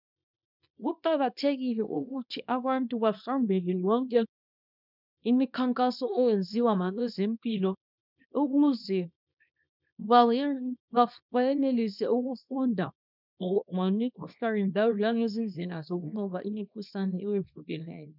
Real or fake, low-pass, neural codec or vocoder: fake; 5.4 kHz; codec, 24 kHz, 0.9 kbps, WavTokenizer, small release